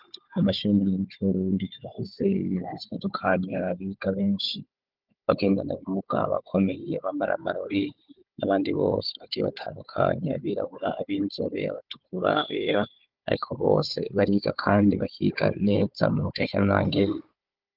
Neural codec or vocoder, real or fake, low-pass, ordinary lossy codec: codec, 16 kHz, 4 kbps, FunCodec, trained on Chinese and English, 50 frames a second; fake; 5.4 kHz; Opus, 24 kbps